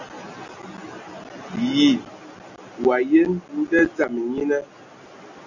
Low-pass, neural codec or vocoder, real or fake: 7.2 kHz; none; real